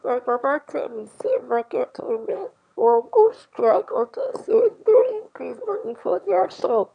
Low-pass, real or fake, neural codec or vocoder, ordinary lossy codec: 9.9 kHz; fake; autoencoder, 22.05 kHz, a latent of 192 numbers a frame, VITS, trained on one speaker; none